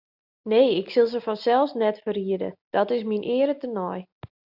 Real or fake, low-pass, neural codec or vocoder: real; 5.4 kHz; none